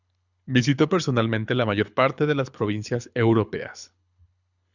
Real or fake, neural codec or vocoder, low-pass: fake; codec, 24 kHz, 6 kbps, HILCodec; 7.2 kHz